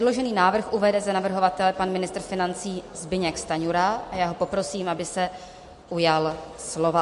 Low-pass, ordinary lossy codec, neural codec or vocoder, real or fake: 14.4 kHz; MP3, 48 kbps; none; real